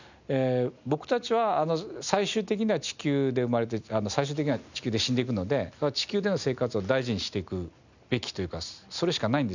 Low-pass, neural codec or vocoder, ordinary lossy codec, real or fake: 7.2 kHz; none; none; real